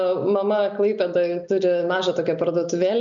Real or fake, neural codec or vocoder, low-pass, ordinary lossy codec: real; none; 7.2 kHz; MP3, 96 kbps